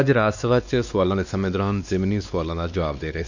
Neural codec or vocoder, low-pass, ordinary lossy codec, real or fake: codec, 16 kHz, 2 kbps, X-Codec, WavLM features, trained on Multilingual LibriSpeech; 7.2 kHz; none; fake